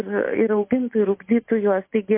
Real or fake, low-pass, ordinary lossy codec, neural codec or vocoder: real; 3.6 kHz; MP3, 24 kbps; none